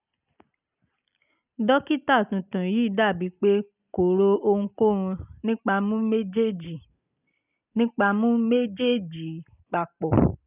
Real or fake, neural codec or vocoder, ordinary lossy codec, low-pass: real; none; none; 3.6 kHz